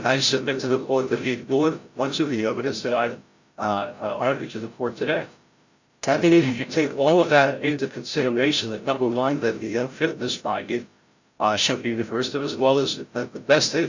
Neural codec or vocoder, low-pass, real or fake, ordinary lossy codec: codec, 16 kHz, 0.5 kbps, FreqCodec, larger model; 7.2 kHz; fake; Opus, 64 kbps